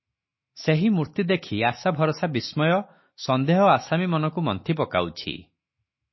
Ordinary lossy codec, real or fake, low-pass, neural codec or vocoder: MP3, 24 kbps; fake; 7.2 kHz; autoencoder, 48 kHz, 128 numbers a frame, DAC-VAE, trained on Japanese speech